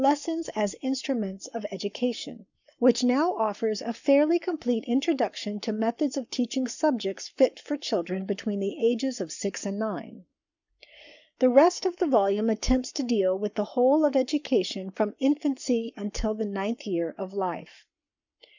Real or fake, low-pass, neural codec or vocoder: fake; 7.2 kHz; codec, 44.1 kHz, 7.8 kbps, Pupu-Codec